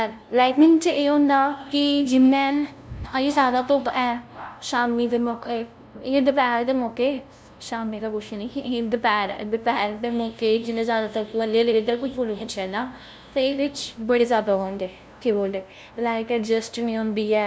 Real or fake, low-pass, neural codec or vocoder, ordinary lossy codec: fake; none; codec, 16 kHz, 0.5 kbps, FunCodec, trained on LibriTTS, 25 frames a second; none